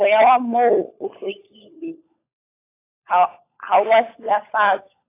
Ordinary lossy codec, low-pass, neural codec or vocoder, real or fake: AAC, 24 kbps; 3.6 kHz; codec, 16 kHz, 16 kbps, FunCodec, trained on LibriTTS, 50 frames a second; fake